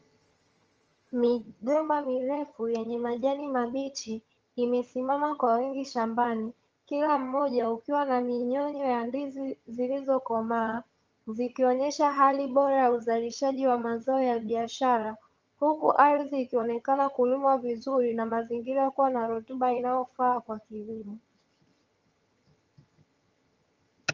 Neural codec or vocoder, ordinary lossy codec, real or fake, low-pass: vocoder, 22.05 kHz, 80 mel bands, HiFi-GAN; Opus, 24 kbps; fake; 7.2 kHz